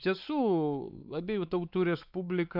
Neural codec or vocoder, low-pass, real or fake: codec, 16 kHz, 4 kbps, X-Codec, WavLM features, trained on Multilingual LibriSpeech; 5.4 kHz; fake